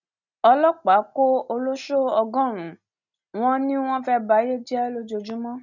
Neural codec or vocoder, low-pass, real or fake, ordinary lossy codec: none; 7.2 kHz; real; none